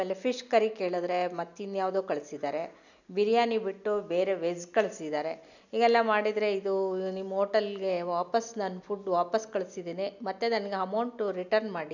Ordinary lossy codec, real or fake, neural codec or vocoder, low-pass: none; real; none; 7.2 kHz